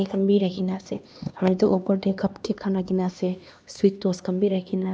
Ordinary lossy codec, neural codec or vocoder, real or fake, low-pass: none; codec, 16 kHz, 2 kbps, X-Codec, HuBERT features, trained on LibriSpeech; fake; none